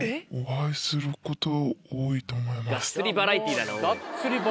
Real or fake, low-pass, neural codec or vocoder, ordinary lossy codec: real; none; none; none